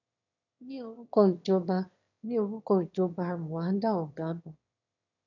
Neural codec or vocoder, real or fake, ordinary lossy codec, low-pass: autoencoder, 22.05 kHz, a latent of 192 numbers a frame, VITS, trained on one speaker; fake; none; 7.2 kHz